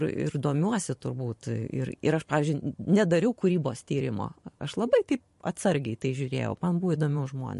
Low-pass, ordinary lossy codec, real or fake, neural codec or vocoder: 14.4 kHz; MP3, 48 kbps; fake; vocoder, 44.1 kHz, 128 mel bands every 512 samples, BigVGAN v2